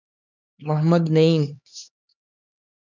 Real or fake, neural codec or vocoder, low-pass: fake; codec, 16 kHz, 2 kbps, X-Codec, HuBERT features, trained on LibriSpeech; 7.2 kHz